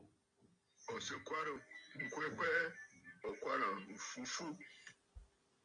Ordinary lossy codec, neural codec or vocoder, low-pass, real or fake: MP3, 64 kbps; none; 9.9 kHz; real